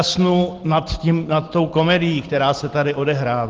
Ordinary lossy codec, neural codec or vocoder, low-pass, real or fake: Opus, 16 kbps; none; 7.2 kHz; real